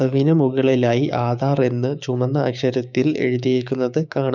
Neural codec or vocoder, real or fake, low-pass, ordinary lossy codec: codec, 16 kHz, 6 kbps, DAC; fake; 7.2 kHz; none